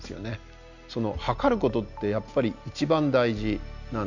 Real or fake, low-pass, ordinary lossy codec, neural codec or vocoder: real; 7.2 kHz; none; none